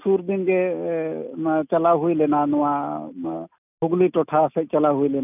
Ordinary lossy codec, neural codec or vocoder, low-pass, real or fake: none; none; 3.6 kHz; real